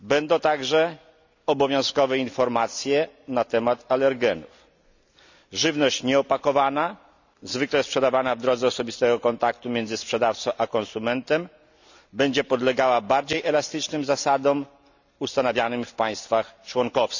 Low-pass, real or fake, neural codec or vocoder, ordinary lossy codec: 7.2 kHz; real; none; none